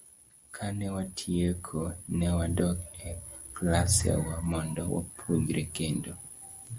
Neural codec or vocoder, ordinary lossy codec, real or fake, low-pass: none; Opus, 64 kbps; real; 10.8 kHz